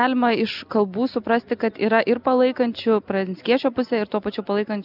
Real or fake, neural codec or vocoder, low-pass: real; none; 5.4 kHz